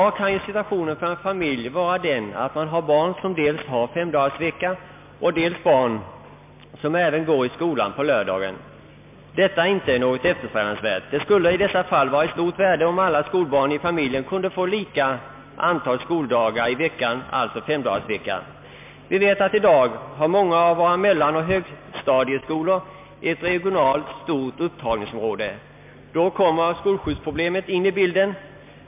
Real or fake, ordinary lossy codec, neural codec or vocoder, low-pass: real; MP3, 32 kbps; none; 3.6 kHz